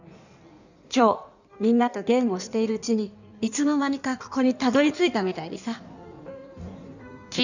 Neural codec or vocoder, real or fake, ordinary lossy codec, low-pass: codec, 16 kHz in and 24 kHz out, 1.1 kbps, FireRedTTS-2 codec; fake; none; 7.2 kHz